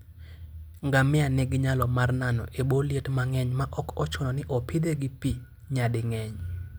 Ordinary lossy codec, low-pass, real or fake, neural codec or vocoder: none; none; real; none